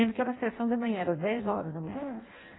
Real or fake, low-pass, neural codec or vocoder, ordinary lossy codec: fake; 7.2 kHz; codec, 16 kHz in and 24 kHz out, 0.6 kbps, FireRedTTS-2 codec; AAC, 16 kbps